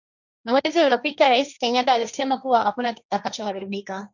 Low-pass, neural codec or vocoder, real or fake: 7.2 kHz; codec, 16 kHz, 1.1 kbps, Voila-Tokenizer; fake